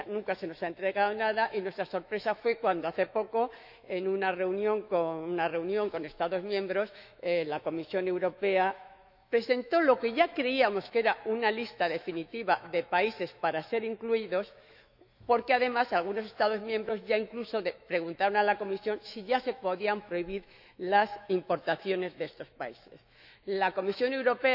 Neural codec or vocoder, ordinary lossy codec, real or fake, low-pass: autoencoder, 48 kHz, 128 numbers a frame, DAC-VAE, trained on Japanese speech; none; fake; 5.4 kHz